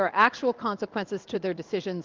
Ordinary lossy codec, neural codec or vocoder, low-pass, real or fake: Opus, 24 kbps; codec, 16 kHz in and 24 kHz out, 1 kbps, XY-Tokenizer; 7.2 kHz; fake